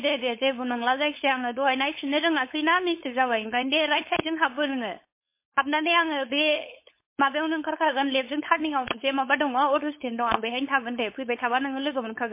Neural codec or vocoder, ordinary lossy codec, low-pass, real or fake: codec, 16 kHz, 4.8 kbps, FACodec; MP3, 24 kbps; 3.6 kHz; fake